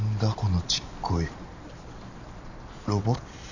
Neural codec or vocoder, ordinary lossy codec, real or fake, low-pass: none; none; real; 7.2 kHz